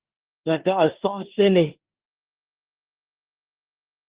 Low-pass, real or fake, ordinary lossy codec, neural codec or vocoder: 3.6 kHz; fake; Opus, 16 kbps; codec, 16 kHz in and 24 kHz out, 0.9 kbps, LongCat-Audio-Codec, four codebook decoder